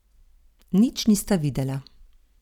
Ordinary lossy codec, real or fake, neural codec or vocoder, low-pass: none; fake; vocoder, 44.1 kHz, 128 mel bands every 256 samples, BigVGAN v2; 19.8 kHz